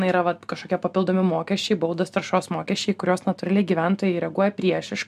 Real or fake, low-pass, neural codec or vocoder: real; 14.4 kHz; none